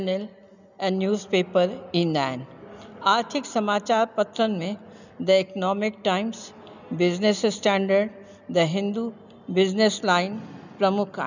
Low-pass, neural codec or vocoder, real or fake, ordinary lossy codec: 7.2 kHz; none; real; none